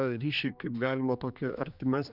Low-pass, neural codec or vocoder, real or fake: 5.4 kHz; codec, 16 kHz, 2 kbps, X-Codec, HuBERT features, trained on general audio; fake